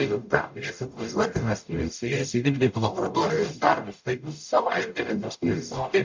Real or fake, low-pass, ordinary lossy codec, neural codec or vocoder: fake; 7.2 kHz; MP3, 48 kbps; codec, 44.1 kHz, 0.9 kbps, DAC